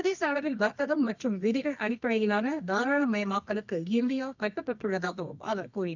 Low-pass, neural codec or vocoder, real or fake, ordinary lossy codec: 7.2 kHz; codec, 24 kHz, 0.9 kbps, WavTokenizer, medium music audio release; fake; none